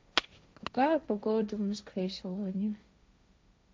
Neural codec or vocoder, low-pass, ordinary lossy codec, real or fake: codec, 16 kHz, 1.1 kbps, Voila-Tokenizer; none; none; fake